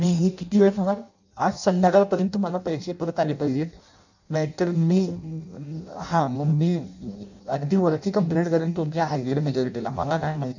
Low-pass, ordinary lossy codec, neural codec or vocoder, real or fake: 7.2 kHz; none; codec, 16 kHz in and 24 kHz out, 0.6 kbps, FireRedTTS-2 codec; fake